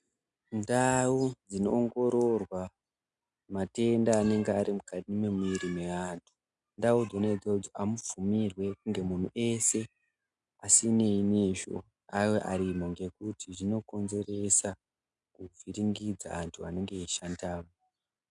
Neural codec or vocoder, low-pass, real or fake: none; 10.8 kHz; real